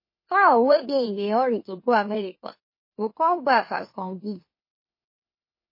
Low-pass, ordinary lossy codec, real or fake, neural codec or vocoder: 5.4 kHz; MP3, 24 kbps; fake; autoencoder, 44.1 kHz, a latent of 192 numbers a frame, MeloTTS